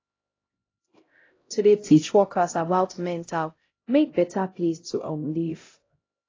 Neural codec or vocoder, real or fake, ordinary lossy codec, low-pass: codec, 16 kHz, 0.5 kbps, X-Codec, HuBERT features, trained on LibriSpeech; fake; AAC, 32 kbps; 7.2 kHz